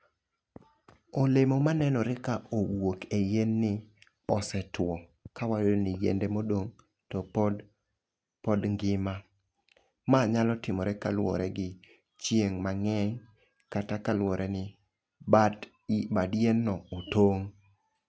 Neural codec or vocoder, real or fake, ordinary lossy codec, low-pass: none; real; none; none